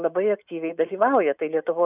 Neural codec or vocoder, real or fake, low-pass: none; real; 3.6 kHz